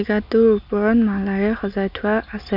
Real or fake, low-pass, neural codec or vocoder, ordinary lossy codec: real; 5.4 kHz; none; none